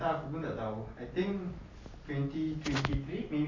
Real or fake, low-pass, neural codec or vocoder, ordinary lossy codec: real; 7.2 kHz; none; MP3, 48 kbps